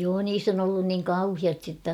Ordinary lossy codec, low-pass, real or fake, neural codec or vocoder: none; 19.8 kHz; real; none